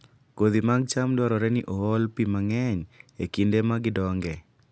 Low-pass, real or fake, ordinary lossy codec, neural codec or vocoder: none; real; none; none